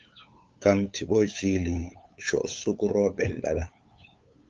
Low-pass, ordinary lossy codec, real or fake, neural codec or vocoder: 7.2 kHz; Opus, 32 kbps; fake; codec, 16 kHz, 8 kbps, FunCodec, trained on LibriTTS, 25 frames a second